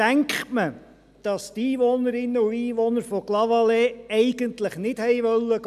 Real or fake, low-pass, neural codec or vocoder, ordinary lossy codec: real; 14.4 kHz; none; none